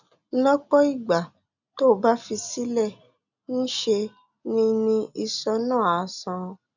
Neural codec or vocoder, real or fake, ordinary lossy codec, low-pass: none; real; none; 7.2 kHz